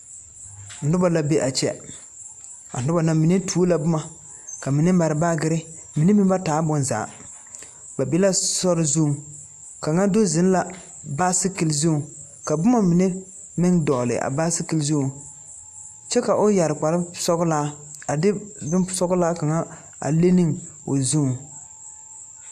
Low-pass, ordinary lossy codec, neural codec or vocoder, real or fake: 14.4 kHz; AAC, 96 kbps; none; real